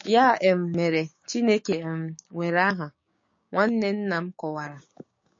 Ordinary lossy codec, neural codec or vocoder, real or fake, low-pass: MP3, 32 kbps; codec, 16 kHz, 6 kbps, DAC; fake; 7.2 kHz